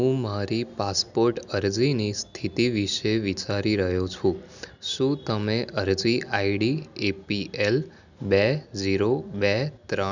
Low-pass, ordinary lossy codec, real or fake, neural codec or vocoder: 7.2 kHz; none; real; none